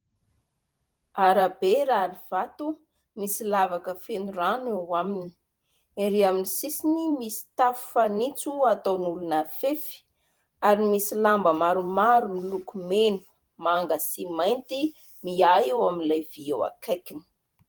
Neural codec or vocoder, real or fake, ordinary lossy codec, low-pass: vocoder, 44.1 kHz, 128 mel bands every 512 samples, BigVGAN v2; fake; Opus, 24 kbps; 19.8 kHz